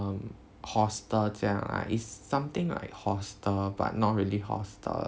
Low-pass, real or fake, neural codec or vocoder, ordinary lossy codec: none; real; none; none